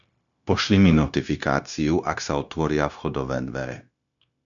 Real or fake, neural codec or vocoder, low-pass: fake; codec, 16 kHz, 0.9 kbps, LongCat-Audio-Codec; 7.2 kHz